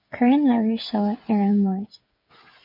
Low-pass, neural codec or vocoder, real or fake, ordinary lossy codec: 5.4 kHz; codec, 16 kHz, 8 kbps, FreqCodec, smaller model; fake; MP3, 32 kbps